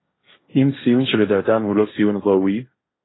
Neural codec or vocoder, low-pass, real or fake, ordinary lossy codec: codec, 16 kHz, 1.1 kbps, Voila-Tokenizer; 7.2 kHz; fake; AAC, 16 kbps